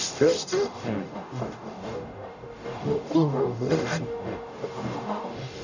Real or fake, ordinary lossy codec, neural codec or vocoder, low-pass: fake; none; codec, 44.1 kHz, 0.9 kbps, DAC; 7.2 kHz